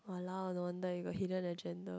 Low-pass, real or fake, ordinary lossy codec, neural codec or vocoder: none; real; none; none